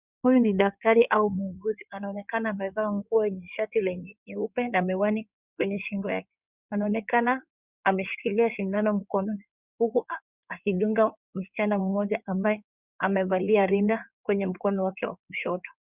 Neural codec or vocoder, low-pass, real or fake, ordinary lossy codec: codec, 16 kHz in and 24 kHz out, 2.2 kbps, FireRedTTS-2 codec; 3.6 kHz; fake; Opus, 64 kbps